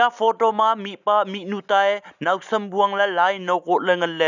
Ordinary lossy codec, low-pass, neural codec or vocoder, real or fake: none; 7.2 kHz; none; real